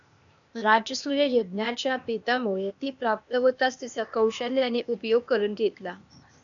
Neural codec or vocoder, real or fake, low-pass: codec, 16 kHz, 0.8 kbps, ZipCodec; fake; 7.2 kHz